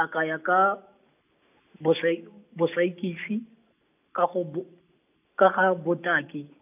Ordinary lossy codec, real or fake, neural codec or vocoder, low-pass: AAC, 32 kbps; real; none; 3.6 kHz